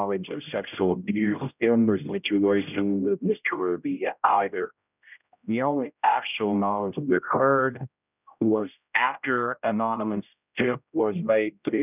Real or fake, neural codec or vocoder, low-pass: fake; codec, 16 kHz, 0.5 kbps, X-Codec, HuBERT features, trained on general audio; 3.6 kHz